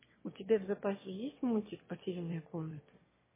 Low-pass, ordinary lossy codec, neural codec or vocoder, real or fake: 3.6 kHz; MP3, 16 kbps; autoencoder, 22.05 kHz, a latent of 192 numbers a frame, VITS, trained on one speaker; fake